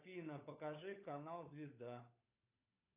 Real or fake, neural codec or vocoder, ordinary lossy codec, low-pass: fake; codec, 16 kHz, 16 kbps, FunCodec, trained on LibriTTS, 50 frames a second; AAC, 32 kbps; 3.6 kHz